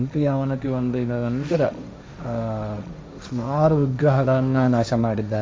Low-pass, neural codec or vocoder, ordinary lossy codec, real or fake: none; codec, 16 kHz, 1.1 kbps, Voila-Tokenizer; none; fake